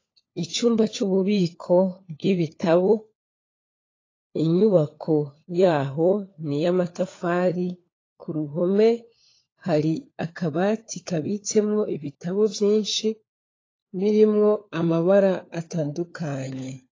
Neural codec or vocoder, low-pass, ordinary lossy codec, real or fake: codec, 16 kHz, 4 kbps, FunCodec, trained on LibriTTS, 50 frames a second; 7.2 kHz; AAC, 32 kbps; fake